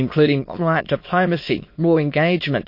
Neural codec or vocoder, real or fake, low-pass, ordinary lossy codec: autoencoder, 22.05 kHz, a latent of 192 numbers a frame, VITS, trained on many speakers; fake; 5.4 kHz; MP3, 32 kbps